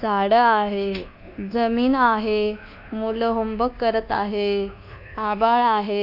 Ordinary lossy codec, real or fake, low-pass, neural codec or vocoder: none; fake; 5.4 kHz; codec, 24 kHz, 1.2 kbps, DualCodec